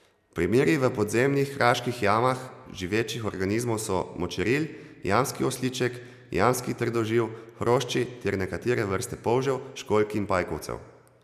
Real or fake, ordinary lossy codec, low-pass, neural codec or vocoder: real; none; 14.4 kHz; none